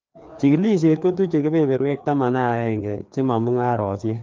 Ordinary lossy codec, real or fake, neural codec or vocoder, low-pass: Opus, 24 kbps; fake; codec, 16 kHz, 2 kbps, FreqCodec, larger model; 7.2 kHz